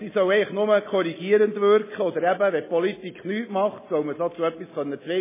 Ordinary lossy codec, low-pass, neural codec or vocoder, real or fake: MP3, 16 kbps; 3.6 kHz; none; real